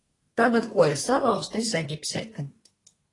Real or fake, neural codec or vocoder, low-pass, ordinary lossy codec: fake; codec, 24 kHz, 1 kbps, SNAC; 10.8 kHz; AAC, 32 kbps